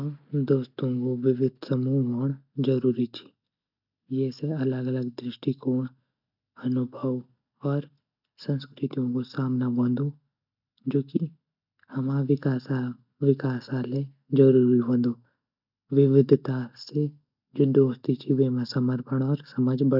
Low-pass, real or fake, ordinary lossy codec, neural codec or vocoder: 5.4 kHz; real; none; none